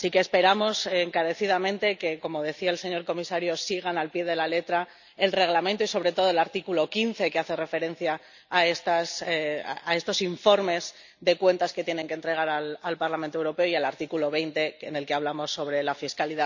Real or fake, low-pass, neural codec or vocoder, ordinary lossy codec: real; 7.2 kHz; none; none